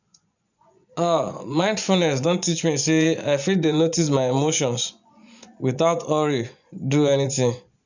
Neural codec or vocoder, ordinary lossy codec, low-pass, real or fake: vocoder, 24 kHz, 100 mel bands, Vocos; none; 7.2 kHz; fake